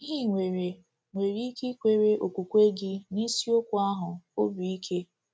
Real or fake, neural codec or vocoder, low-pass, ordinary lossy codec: real; none; none; none